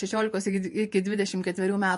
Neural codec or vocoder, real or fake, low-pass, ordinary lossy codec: vocoder, 48 kHz, 128 mel bands, Vocos; fake; 14.4 kHz; MP3, 48 kbps